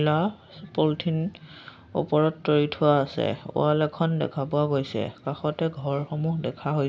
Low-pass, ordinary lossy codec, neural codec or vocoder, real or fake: none; none; none; real